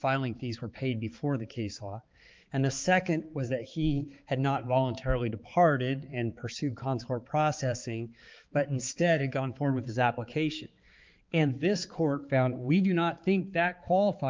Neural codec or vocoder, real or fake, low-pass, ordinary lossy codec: codec, 16 kHz, 4 kbps, X-Codec, HuBERT features, trained on balanced general audio; fake; 7.2 kHz; Opus, 32 kbps